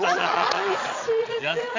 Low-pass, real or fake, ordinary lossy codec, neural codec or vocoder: 7.2 kHz; fake; MP3, 64 kbps; vocoder, 22.05 kHz, 80 mel bands, Vocos